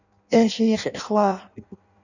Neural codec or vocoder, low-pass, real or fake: codec, 16 kHz in and 24 kHz out, 0.6 kbps, FireRedTTS-2 codec; 7.2 kHz; fake